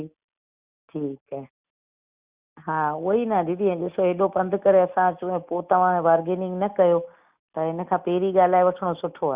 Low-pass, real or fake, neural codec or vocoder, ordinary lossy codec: 3.6 kHz; real; none; Opus, 64 kbps